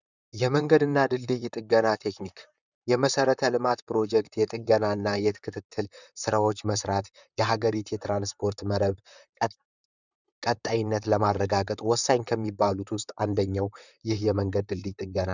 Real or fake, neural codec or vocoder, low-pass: fake; vocoder, 44.1 kHz, 128 mel bands, Pupu-Vocoder; 7.2 kHz